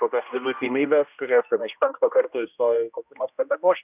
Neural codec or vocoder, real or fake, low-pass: codec, 16 kHz, 1 kbps, X-Codec, HuBERT features, trained on general audio; fake; 3.6 kHz